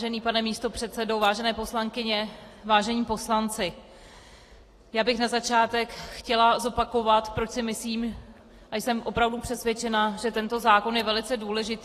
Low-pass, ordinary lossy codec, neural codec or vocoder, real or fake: 14.4 kHz; AAC, 48 kbps; vocoder, 44.1 kHz, 128 mel bands every 256 samples, BigVGAN v2; fake